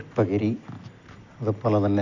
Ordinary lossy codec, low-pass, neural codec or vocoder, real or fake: none; 7.2 kHz; none; real